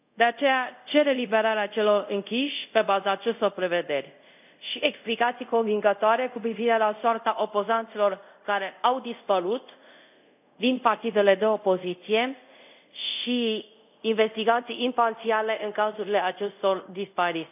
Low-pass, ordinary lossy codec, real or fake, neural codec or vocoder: 3.6 kHz; none; fake; codec, 24 kHz, 0.5 kbps, DualCodec